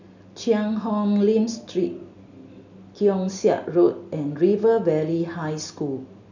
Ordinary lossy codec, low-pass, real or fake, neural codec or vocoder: none; 7.2 kHz; real; none